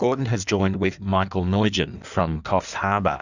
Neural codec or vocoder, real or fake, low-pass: codec, 16 kHz in and 24 kHz out, 1.1 kbps, FireRedTTS-2 codec; fake; 7.2 kHz